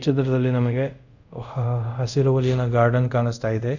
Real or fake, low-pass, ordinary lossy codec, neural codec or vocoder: fake; 7.2 kHz; none; codec, 24 kHz, 0.5 kbps, DualCodec